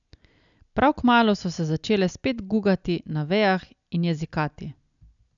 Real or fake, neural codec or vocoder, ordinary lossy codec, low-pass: real; none; none; 7.2 kHz